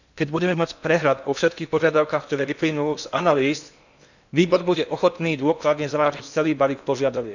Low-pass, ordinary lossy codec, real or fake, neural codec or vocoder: 7.2 kHz; none; fake; codec, 16 kHz in and 24 kHz out, 0.8 kbps, FocalCodec, streaming, 65536 codes